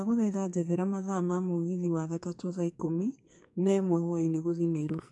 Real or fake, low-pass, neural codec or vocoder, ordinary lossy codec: fake; 10.8 kHz; codec, 44.1 kHz, 2.6 kbps, SNAC; MP3, 64 kbps